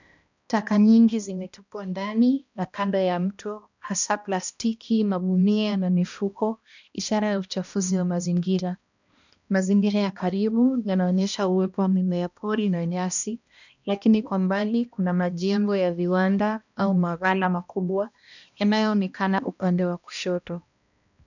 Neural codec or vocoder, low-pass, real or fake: codec, 16 kHz, 1 kbps, X-Codec, HuBERT features, trained on balanced general audio; 7.2 kHz; fake